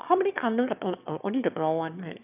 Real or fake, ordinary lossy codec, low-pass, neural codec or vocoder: fake; none; 3.6 kHz; autoencoder, 22.05 kHz, a latent of 192 numbers a frame, VITS, trained on one speaker